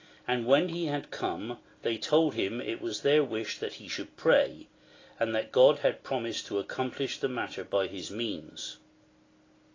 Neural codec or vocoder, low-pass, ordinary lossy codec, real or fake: none; 7.2 kHz; AAC, 32 kbps; real